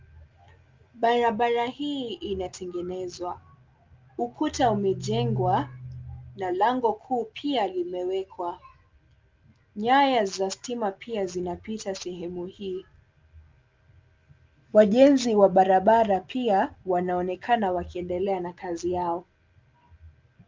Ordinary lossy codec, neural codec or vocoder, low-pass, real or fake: Opus, 32 kbps; none; 7.2 kHz; real